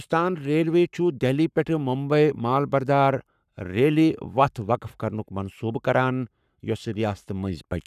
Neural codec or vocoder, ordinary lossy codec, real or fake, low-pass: codec, 44.1 kHz, 7.8 kbps, Pupu-Codec; none; fake; 14.4 kHz